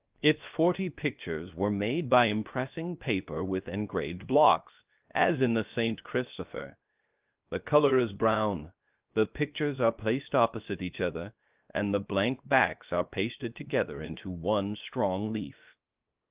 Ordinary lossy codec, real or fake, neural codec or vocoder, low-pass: Opus, 32 kbps; fake; codec, 16 kHz, 0.7 kbps, FocalCodec; 3.6 kHz